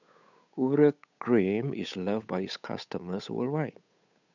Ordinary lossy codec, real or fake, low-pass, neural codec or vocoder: none; fake; 7.2 kHz; codec, 16 kHz, 8 kbps, FunCodec, trained on Chinese and English, 25 frames a second